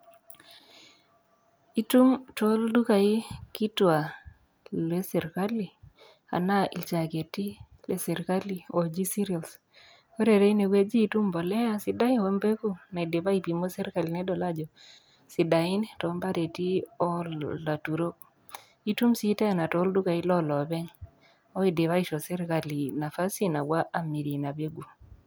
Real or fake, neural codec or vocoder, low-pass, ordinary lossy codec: real; none; none; none